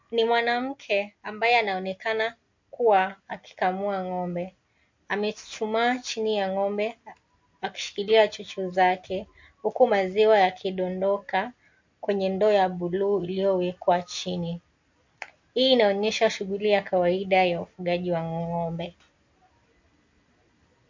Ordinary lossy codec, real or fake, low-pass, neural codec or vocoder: MP3, 48 kbps; real; 7.2 kHz; none